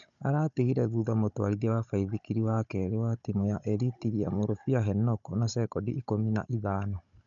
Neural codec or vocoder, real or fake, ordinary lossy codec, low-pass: codec, 16 kHz, 16 kbps, FunCodec, trained on Chinese and English, 50 frames a second; fake; none; 7.2 kHz